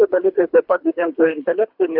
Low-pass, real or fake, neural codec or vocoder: 5.4 kHz; fake; codec, 24 kHz, 3 kbps, HILCodec